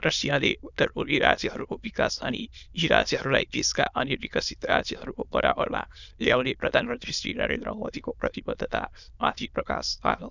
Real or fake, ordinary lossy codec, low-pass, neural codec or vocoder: fake; none; 7.2 kHz; autoencoder, 22.05 kHz, a latent of 192 numbers a frame, VITS, trained on many speakers